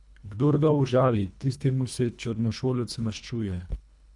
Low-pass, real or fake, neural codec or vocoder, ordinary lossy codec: 10.8 kHz; fake; codec, 24 kHz, 1.5 kbps, HILCodec; none